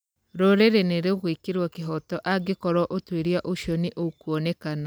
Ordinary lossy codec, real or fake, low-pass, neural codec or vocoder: none; real; none; none